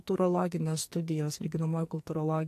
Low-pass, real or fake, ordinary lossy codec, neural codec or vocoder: 14.4 kHz; fake; AAC, 64 kbps; codec, 44.1 kHz, 2.6 kbps, SNAC